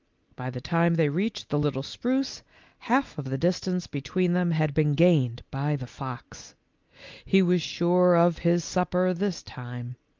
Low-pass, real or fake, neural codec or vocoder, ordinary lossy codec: 7.2 kHz; real; none; Opus, 24 kbps